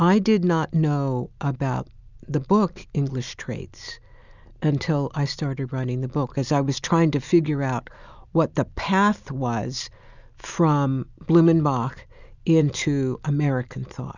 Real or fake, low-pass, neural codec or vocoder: real; 7.2 kHz; none